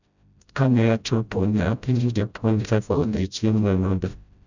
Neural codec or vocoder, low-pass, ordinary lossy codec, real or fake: codec, 16 kHz, 0.5 kbps, FreqCodec, smaller model; 7.2 kHz; none; fake